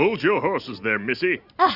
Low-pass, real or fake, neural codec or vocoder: 5.4 kHz; real; none